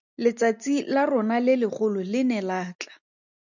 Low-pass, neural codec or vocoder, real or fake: 7.2 kHz; none; real